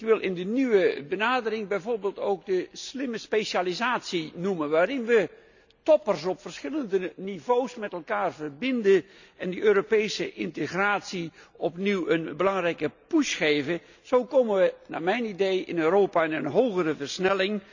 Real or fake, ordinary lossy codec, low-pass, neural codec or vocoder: real; none; 7.2 kHz; none